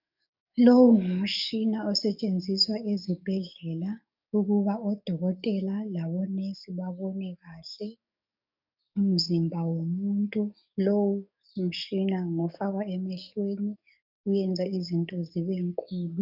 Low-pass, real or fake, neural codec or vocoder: 5.4 kHz; fake; codec, 44.1 kHz, 7.8 kbps, DAC